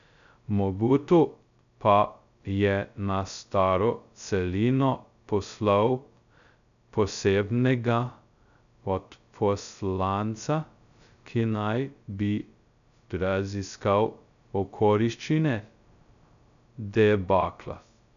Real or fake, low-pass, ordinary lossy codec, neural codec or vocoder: fake; 7.2 kHz; none; codec, 16 kHz, 0.2 kbps, FocalCodec